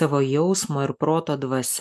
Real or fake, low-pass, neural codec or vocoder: real; 14.4 kHz; none